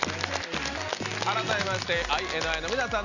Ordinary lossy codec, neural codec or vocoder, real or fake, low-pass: none; none; real; 7.2 kHz